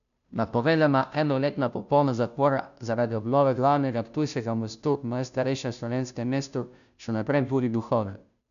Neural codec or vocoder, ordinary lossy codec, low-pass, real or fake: codec, 16 kHz, 0.5 kbps, FunCodec, trained on Chinese and English, 25 frames a second; none; 7.2 kHz; fake